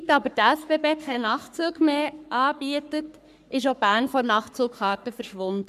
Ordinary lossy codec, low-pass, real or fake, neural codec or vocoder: none; 14.4 kHz; fake; codec, 44.1 kHz, 3.4 kbps, Pupu-Codec